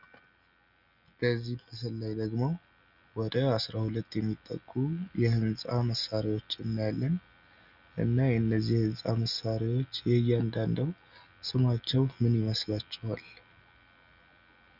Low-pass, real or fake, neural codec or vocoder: 5.4 kHz; real; none